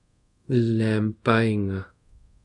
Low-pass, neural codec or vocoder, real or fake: 10.8 kHz; codec, 24 kHz, 0.5 kbps, DualCodec; fake